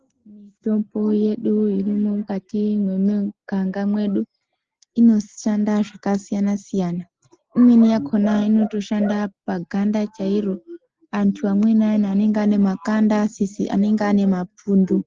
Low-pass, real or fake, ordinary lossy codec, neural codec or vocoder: 7.2 kHz; real; Opus, 16 kbps; none